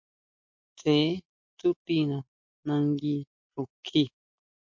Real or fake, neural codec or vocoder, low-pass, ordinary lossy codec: real; none; 7.2 kHz; MP3, 48 kbps